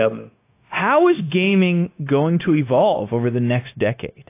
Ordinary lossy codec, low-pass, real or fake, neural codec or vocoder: AAC, 24 kbps; 3.6 kHz; fake; codec, 16 kHz, 0.9 kbps, LongCat-Audio-Codec